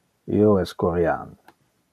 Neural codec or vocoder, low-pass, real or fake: none; 14.4 kHz; real